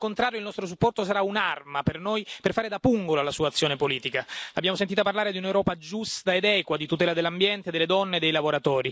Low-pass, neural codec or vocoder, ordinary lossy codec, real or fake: none; none; none; real